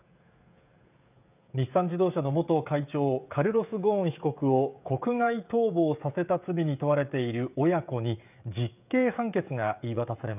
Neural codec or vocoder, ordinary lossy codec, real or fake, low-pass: codec, 16 kHz, 16 kbps, FreqCodec, smaller model; none; fake; 3.6 kHz